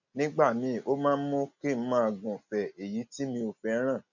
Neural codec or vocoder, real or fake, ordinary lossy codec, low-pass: none; real; none; 7.2 kHz